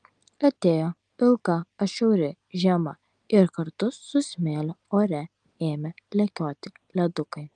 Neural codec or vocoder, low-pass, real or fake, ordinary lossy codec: none; 9.9 kHz; real; Opus, 32 kbps